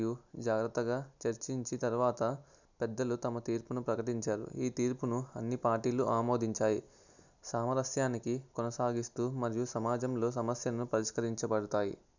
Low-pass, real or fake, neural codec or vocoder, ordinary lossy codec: 7.2 kHz; real; none; none